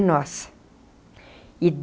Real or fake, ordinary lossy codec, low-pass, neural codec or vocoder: real; none; none; none